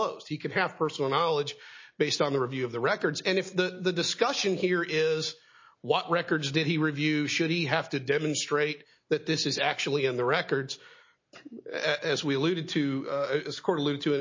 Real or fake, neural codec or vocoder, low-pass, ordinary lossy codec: real; none; 7.2 kHz; MP3, 32 kbps